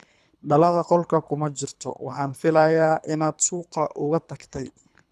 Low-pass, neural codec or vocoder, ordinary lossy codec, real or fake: none; codec, 24 kHz, 3 kbps, HILCodec; none; fake